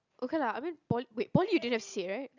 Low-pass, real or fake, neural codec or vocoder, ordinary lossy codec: 7.2 kHz; real; none; none